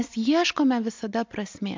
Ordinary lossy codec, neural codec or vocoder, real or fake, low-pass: MP3, 64 kbps; none; real; 7.2 kHz